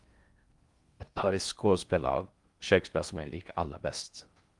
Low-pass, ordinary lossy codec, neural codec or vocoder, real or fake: 10.8 kHz; Opus, 32 kbps; codec, 16 kHz in and 24 kHz out, 0.6 kbps, FocalCodec, streaming, 2048 codes; fake